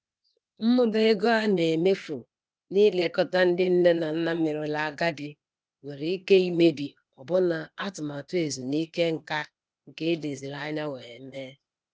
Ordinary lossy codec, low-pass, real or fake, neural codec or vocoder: none; none; fake; codec, 16 kHz, 0.8 kbps, ZipCodec